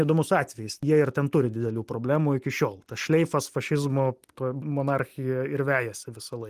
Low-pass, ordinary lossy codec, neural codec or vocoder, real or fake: 14.4 kHz; Opus, 24 kbps; none; real